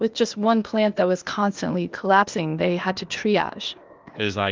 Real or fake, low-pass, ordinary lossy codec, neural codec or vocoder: fake; 7.2 kHz; Opus, 32 kbps; codec, 16 kHz, 0.8 kbps, ZipCodec